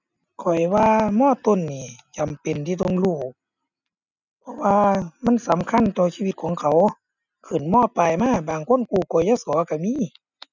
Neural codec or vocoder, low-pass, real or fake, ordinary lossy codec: none; 7.2 kHz; real; none